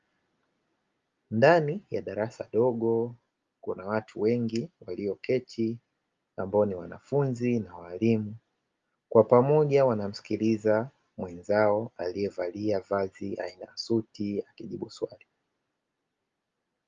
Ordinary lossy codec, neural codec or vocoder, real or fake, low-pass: Opus, 32 kbps; none; real; 7.2 kHz